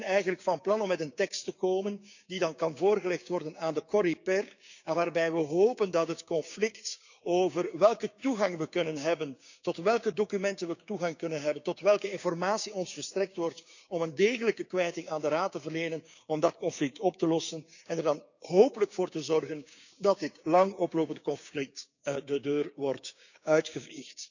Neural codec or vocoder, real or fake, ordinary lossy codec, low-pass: codec, 16 kHz, 6 kbps, DAC; fake; none; 7.2 kHz